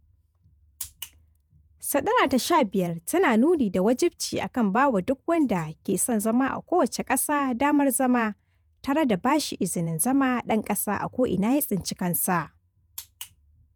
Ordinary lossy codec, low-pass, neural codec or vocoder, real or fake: none; none; vocoder, 48 kHz, 128 mel bands, Vocos; fake